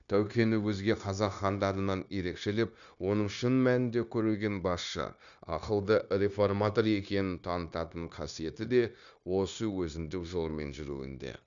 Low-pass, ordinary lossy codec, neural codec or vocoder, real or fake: 7.2 kHz; none; codec, 16 kHz, 0.9 kbps, LongCat-Audio-Codec; fake